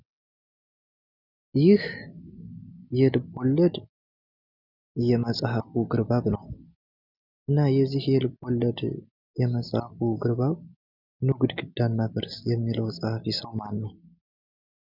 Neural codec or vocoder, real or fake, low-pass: none; real; 5.4 kHz